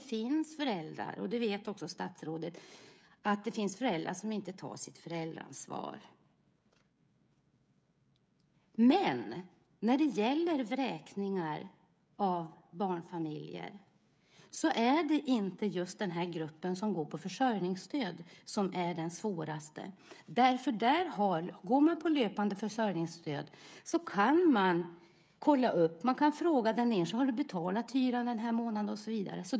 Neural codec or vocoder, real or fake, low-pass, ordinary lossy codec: codec, 16 kHz, 16 kbps, FreqCodec, smaller model; fake; none; none